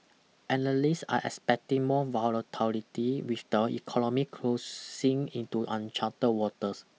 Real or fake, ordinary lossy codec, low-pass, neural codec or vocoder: real; none; none; none